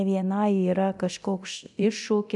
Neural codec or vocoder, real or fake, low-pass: codec, 24 kHz, 0.9 kbps, DualCodec; fake; 10.8 kHz